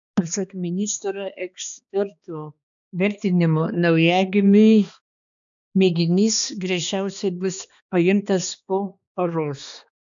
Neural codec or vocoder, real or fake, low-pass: codec, 16 kHz, 2 kbps, X-Codec, HuBERT features, trained on balanced general audio; fake; 7.2 kHz